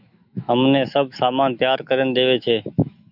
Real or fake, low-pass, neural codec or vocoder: fake; 5.4 kHz; autoencoder, 48 kHz, 128 numbers a frame, DAC-VAE, trained on Japanese speech